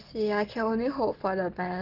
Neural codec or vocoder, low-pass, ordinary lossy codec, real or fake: none; 5.4 kHz; Opus, 16 kbps; real